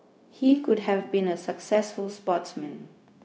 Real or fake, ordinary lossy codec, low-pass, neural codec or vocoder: fake; none; none; codec, 16 kHz, 0.4 kbps, LongCat-Audio-Codec